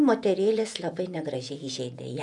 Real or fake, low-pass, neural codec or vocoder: fake; 10.8 kHz; vocoder, 48 kHz, 128 mel bands, Vocos